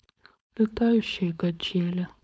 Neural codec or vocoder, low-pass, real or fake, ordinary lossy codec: codec, 16 kHz, 4.8 kbps, FACodec; none; fake; none